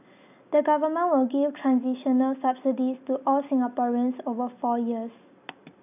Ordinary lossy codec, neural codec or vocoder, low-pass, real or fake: none; none; 3.6 kHz; real